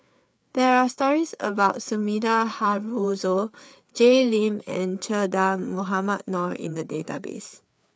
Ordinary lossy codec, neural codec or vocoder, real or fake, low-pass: none; codec, 16 kHz, 4 kbps, FreqCodec, larger model; fake; none